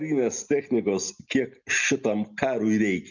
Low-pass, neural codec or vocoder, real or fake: 7.2 kHz; none; real